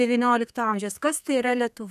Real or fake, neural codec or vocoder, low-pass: fake; codec, 32 kHz, 1.9 kbps, SNAC; 14.4 kHz